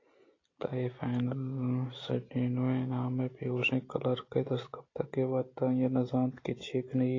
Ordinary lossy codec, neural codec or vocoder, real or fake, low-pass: AAC, 32 kbps; none; real; 7.2 kHz